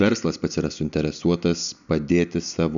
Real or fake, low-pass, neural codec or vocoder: real; 7.2 kHz; none